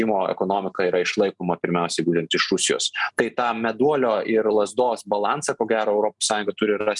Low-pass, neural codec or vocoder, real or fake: 10.8 kHz; none; real